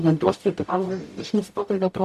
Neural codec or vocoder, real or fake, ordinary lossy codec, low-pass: codec, 44.1 kHz, 0.9 kbps, DAC; fake; MP3, 64 kbps; 14.4 kHz